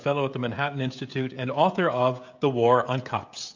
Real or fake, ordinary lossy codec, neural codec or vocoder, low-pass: fake; MP3, 64 kbps; codec, 16 kHz, 16 kbps, FreqCodec, smaller model; 7.2 kHz